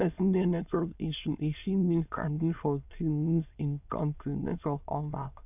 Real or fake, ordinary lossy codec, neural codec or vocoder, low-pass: fake; none; autoencoder, 22.05 kHz, a latent of 192 numbers a frame, VITS, trained on many speakers; 3.6 kHz